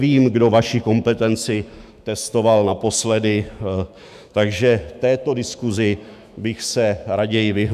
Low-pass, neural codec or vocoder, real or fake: 14.4 kHz; autoencoder, 48 kHz, 128 numbers a frame, DAC-VAE, trained on Japanese speech; fake